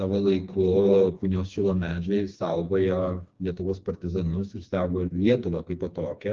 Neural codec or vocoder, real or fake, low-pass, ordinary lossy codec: codec, 16 kHz, 2 kbps, FreqCodec, smaller model; fake; 7.2 kHz; Opus, 32 kbps